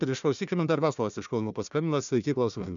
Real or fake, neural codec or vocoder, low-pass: fake; codec, 16 kHz, 1 kbps, FunCodec, trained on Chinese and English, 50 frames a second; 7.2 kHz